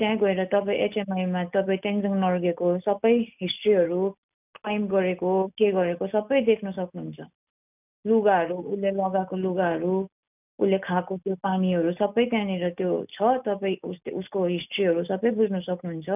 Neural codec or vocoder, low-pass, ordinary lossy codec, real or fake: none; 3.6 kHz; none; real